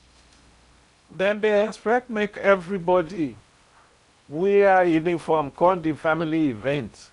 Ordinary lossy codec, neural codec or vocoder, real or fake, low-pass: none; codec, 16 kHz in and 24 kHz out, 0.8 kbps, FocalCodec, streaming, 65536 codes; fake; 10.8 kHz